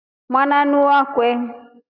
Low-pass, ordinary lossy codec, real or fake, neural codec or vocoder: 5.4 kHz; AAC, 48 kbps; real; none